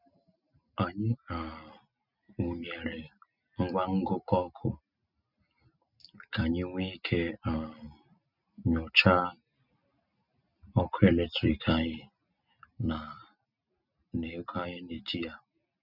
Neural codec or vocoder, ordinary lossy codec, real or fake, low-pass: none; none; real; 5.4 kHz